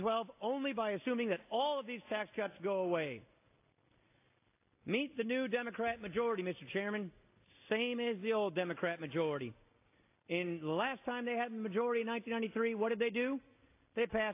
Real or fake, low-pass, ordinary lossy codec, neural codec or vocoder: real; 3.6 kHz; AAC, 24 kbps; none